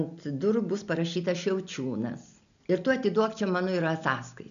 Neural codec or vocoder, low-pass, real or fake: none; 7.2 kHz; real